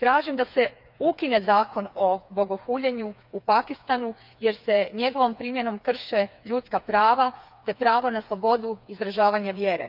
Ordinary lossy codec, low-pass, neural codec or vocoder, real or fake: none; 5.4 kHz; codec, 16 kHz, 4 kbps, FreqCodec, smaller model; fake